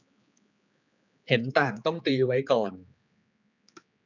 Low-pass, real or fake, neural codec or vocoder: 7.2 kHz; fake; codec, 16 kHz, 4 kbps, X-Codec, HuBERT features, trained on general audio